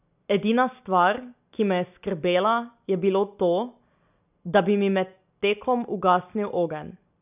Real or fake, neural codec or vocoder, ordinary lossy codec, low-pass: real; none; none; 3.6 kHz